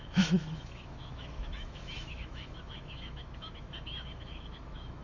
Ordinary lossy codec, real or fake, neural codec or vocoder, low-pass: AAC, 48 kbps; fake; autoencoder, 48 kHz, 128 numbers a frame, DAC-VAE, trained on Japanese speech; 7.2 kHz